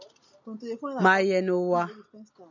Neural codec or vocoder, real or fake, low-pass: none; real; 7.2 kHz